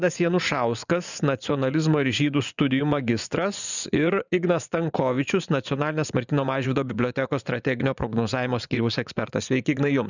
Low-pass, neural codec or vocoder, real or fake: 7.2 kHz; vocoder, 24 kHz, 100 mel bands, Vocos; fake